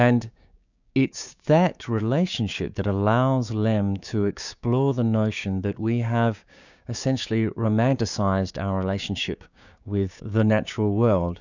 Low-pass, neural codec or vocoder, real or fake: 7.2 kHz; codec, 16 kHz, 6 kbps, DAC; fake